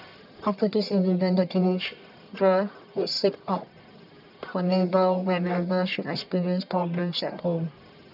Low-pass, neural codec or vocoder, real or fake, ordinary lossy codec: 5.4 kHz; codec, 44.1 kHz, 1.7 kbps, Pupu-Codec; fake; none